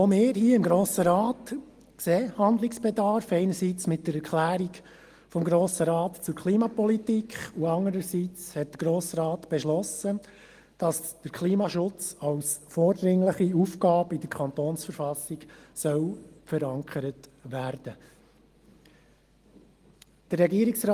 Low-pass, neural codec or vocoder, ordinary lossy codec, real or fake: 14.4 kHz; none; Opus, 24 kbps; real